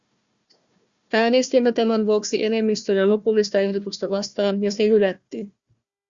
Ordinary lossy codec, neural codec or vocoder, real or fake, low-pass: Opus, 64 kbps; codec, 16 kHz, 1 kbps, FunCodec, trained on Chinese and English, 50 frames a second; fake; 7.2 kHz